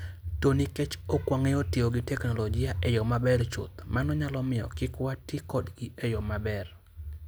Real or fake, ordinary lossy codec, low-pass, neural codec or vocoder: real; none; none; none